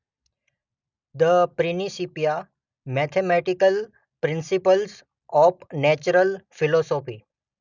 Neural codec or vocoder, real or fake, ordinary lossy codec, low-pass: none; real; none; 7.2 kHz